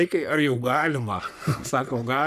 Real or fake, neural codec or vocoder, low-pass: fake; codec, 44.1 kHz, 3.4 kbps, Pupu-Codec; 14.4 kHz